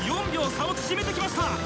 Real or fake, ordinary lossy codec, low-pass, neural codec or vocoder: real; none; none; none